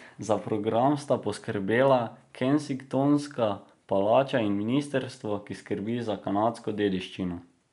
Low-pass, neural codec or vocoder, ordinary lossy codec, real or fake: 10.8 kHz; none; MP3, 96 kbps; real